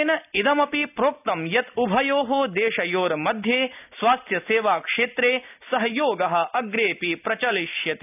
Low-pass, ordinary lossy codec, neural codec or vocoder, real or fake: 3.6 kHz; none; none; real